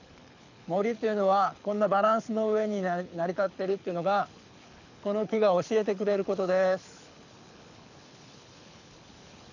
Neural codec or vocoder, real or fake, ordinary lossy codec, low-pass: codec, 24 kHz, 6 kbps, HILCodec; fake; none; 7.2 kHz